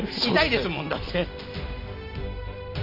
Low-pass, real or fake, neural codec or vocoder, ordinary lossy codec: 5.4 kHz; real; none; none